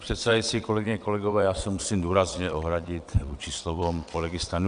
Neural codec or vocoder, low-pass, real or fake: vocoder, 22.05 kHz, 80 mel bands, WaveNeXt; 9.9 kHz; fake